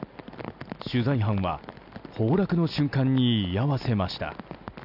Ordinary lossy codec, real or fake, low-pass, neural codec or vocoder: none; real; 5.4 kHz; none